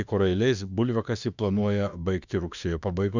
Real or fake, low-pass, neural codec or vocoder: fake; 7.2 kHz; autoencoder, 48 kHz, 32 numbers a frame, DAC-VAE, trained on Japanese speech